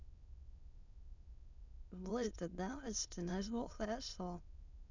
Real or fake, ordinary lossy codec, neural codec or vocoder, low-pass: fake; none; autoencoder, 22.05 kHz, a latent of 192 numbers a frame, VITS, trained on many speakers; 7.2 kHz